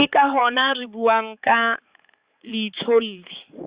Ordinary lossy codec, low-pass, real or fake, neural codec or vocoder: Opus, 64 kbps; 3.6 kHz; fake; codec, 16 kHz, 4 kbps, X-Codec, HuBERT features, trained on balanced general audio